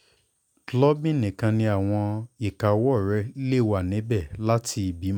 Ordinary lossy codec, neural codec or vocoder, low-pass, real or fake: none; none; 19.8 kHz; real